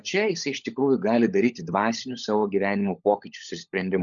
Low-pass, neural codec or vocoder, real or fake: 7.2 kHz; codec, 16 kHz, 16 kbps, FunCodec, trained on LibriTTS, 50 frames a second; fake